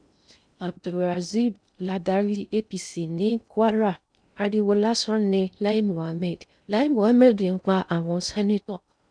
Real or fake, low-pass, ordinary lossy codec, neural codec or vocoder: fake; 9.9 kHz; none; codec, 16 kHz in and 24 kHz out, 0.6 kbps, FocalCodec, streaming, 2048 codes